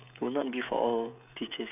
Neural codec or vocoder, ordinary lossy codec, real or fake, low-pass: codec, 16 kHz, 16 kbps, FreqCodec, smaller model; none; fake; 3.6 kHz